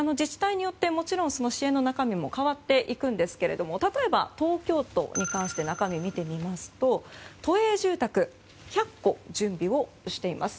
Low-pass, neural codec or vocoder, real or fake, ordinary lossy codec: none; none; real; none